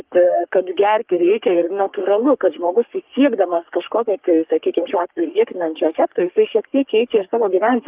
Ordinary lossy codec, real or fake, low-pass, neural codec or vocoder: Opus, 32 kbps; fake; 3.6 kHz; codec, 44.1 kHz, 3.4 kbps, Pupu-Codec